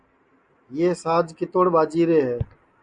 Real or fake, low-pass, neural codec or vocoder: real; 9.9 kHz; none